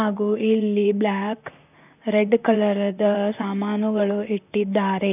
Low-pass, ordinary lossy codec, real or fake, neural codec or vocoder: 3.6 kHz; none; fake; vocoder, 44.1 kHz, 128 mel bands, Pupu-Vocoder